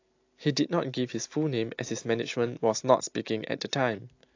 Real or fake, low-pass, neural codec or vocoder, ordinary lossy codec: real; 7.2 kHz; none; AAC, 48 kbps